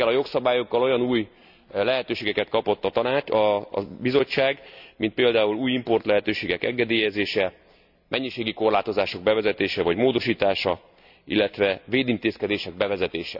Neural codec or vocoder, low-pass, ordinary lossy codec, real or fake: none; 5.4 kHz; none; real